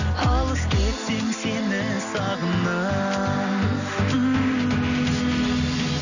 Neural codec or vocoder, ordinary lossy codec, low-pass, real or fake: none; none; 7.2 kHz; real